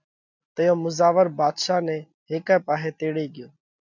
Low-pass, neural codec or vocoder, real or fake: 7.2 kHz; none; real